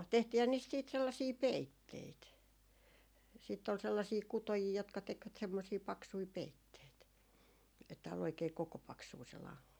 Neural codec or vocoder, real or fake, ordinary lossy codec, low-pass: none; real; none; none